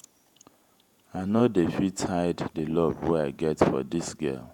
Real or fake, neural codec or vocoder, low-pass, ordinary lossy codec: fake; vocoder, 48 kHz, 128 mel bands, Vocos; 19.8 kHz; none